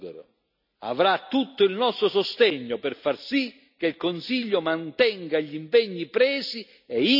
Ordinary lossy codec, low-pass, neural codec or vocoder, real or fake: none; 5.4 kHz; none; real